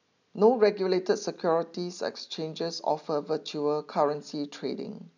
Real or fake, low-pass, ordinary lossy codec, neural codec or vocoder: real; 7.2 kHz; none; none